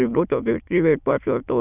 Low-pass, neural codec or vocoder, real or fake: 3.6 kHz; autoencoder, 22.05 kHz, a latent of 192 numbers a frame, VITS, trained on many speakers; fake